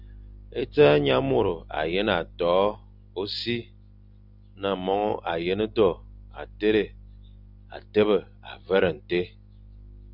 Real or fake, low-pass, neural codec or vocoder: real; 5.4 kHz; none